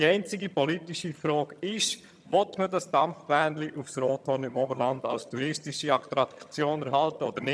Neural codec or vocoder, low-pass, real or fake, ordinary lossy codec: vocoder, 22.05 kHz, 80 mel bands, HiFi-GAN; none; fake; none